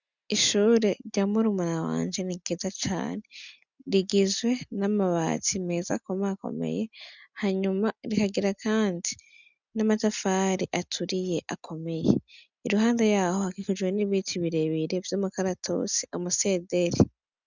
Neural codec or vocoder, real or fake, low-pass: none; real; 7.2 kHz